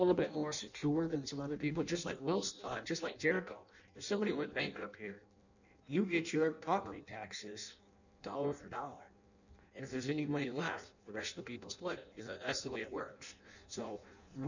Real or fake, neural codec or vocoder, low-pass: fake; codec, 16 kHz in and 24 kHz out, 0.6 kbps, FireRedTTS-2 codec; 7.2 kHz